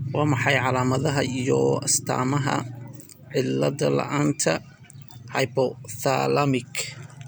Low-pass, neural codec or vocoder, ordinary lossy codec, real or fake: none; none; none; real